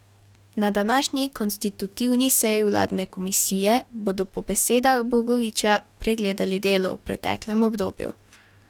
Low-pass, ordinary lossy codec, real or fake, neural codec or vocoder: 19.8 kHz; none; fake; codec, 44.1 kHz, 2.6 kbps, DAC